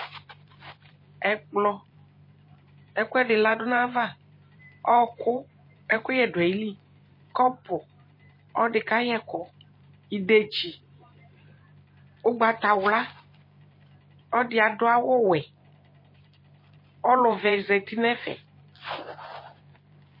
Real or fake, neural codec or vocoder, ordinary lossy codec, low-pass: fake; vocoder, 44.1 kHz, 128 mel bands every 512 samples, BigVGAN v2; MP3, 24 kbps; 5.4 kHz